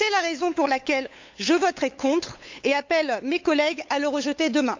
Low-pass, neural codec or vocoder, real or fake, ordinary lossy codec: 7.2 kHz; codec, 16 kHz, 8 kbps, FunCodec, trained on LibriTTS, 25 frames a second; fake; MP3, 64 kbps